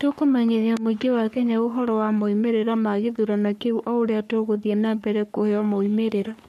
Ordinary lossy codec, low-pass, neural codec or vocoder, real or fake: none; 14.4 kHz; codec, 44.1 kHz, 3.4 kbps, Pupu-Codec; fake